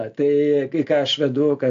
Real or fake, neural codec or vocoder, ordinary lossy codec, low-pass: real; none; AAC, 64 kbps; 7.2 kHz